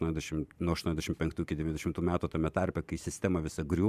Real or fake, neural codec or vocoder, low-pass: fake; vocoder, 44.1 kHz, 128 mel bands every 512 samples, BigVGAN v2; 14.4 kHz